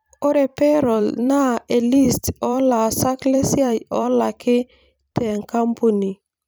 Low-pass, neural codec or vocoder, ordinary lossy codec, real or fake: none; vocoder, 44.1 kHz, 128 mel bands every 256 samples, BigVGAN v2; none; fake